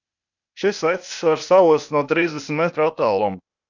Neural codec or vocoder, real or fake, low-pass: codec, 16 kHz, 0.8 kbps, ZipCodec; fake; 7.2 kHz